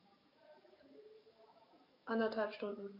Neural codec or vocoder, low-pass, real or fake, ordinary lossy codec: codec, 44.1 kHz, 7.8 kbps, DAC; 5.4 kHz; fake; MP3, 32 kbps